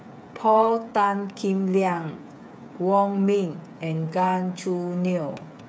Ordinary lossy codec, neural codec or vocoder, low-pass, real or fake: none; codec, 16 kHz, 8 kbps, FreqCodec, smaller model; none; fake